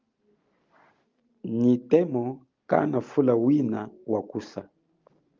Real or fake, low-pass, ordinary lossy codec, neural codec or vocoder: real; 7.2 kHz; Opus, 32 kbps; none